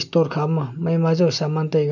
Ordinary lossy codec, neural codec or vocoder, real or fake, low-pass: none; none; real; 7.2 kHz